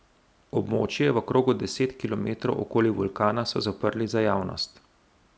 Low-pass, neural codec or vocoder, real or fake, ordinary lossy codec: none; none; real; none